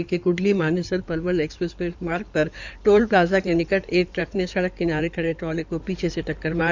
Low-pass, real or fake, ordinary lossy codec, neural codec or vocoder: 7.2 kHz; fake; none; codec, 16 kHz in and 24 kHz out, 2.2 kbps, FireRedTTS-2 codec